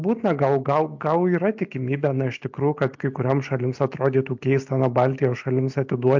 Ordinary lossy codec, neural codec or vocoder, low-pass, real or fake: MP3, 64 kbps; none; 7.2 kHz; real